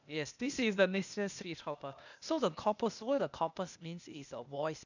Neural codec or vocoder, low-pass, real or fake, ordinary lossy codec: codec, 16 kHz, 0.8 kbps, ZipCodec; 7.2 kHz; fake; none